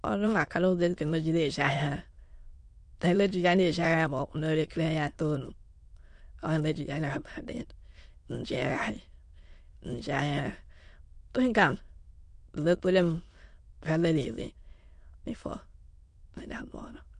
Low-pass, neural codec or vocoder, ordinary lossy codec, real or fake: 9.9 kHz; autoencoder, 22.05 kHz, a latent of 192 numbers a frame, VITS, trained on many speakers; MP3, 48 kbps; fake